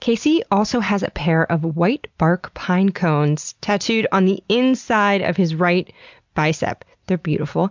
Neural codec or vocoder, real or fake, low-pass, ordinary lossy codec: none; real; 7.2 kHz; MP3, 64 kbps